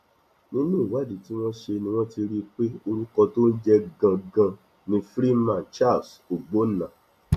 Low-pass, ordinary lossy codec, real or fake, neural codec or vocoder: 14.4 kHz; AAC, 96 kbps; real; none